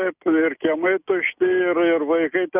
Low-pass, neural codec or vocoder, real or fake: 3.6 kHz; none; real